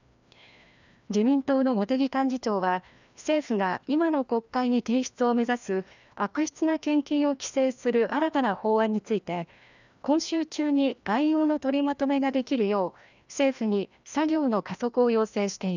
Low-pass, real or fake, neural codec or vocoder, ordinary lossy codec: 7.2 kHz; fake; codec, 16 kHz, 1 kbps, FreqCodec, larger model; none